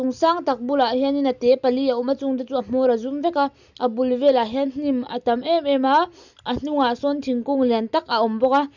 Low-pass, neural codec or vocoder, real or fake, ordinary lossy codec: 7.2 kHz; none; real; none